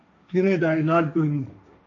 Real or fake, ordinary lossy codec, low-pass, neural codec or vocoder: fake; AAC, 48 kbps; 7.2 kHz; codec, 16 kHz, 1.1 kbps, Voila-Tokenizer